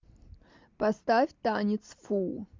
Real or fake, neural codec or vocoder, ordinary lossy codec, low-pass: real; none; MP3, 64 kbps; 7.2 kHz